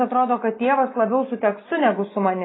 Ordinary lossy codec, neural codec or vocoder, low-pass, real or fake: AAC, 16 kbps; none; 7.2 kHz; real